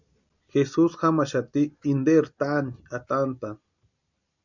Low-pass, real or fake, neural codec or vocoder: 7.2 kHz; real; none